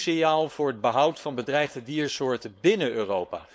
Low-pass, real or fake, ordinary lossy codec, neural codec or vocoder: none; fake; none; codec, 16 kHz, 4.8 kbps, FACodec